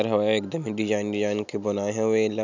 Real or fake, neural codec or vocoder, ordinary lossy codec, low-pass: real; none; none; 7.2 kHz